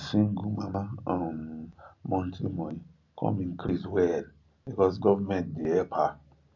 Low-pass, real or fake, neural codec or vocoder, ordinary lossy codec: 7.2 kHz; real; none; MP3, 48 kbps